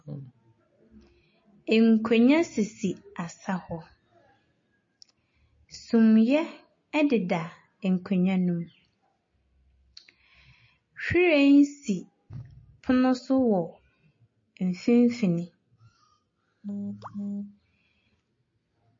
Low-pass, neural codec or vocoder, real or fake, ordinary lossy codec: 7.2 kHz; none; real; MP3, 32 kbps